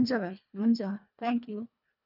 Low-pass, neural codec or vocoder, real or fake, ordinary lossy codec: 5.4 kHz; codec, 24 kHz, 1.5 kbps, HILCodec; fake; none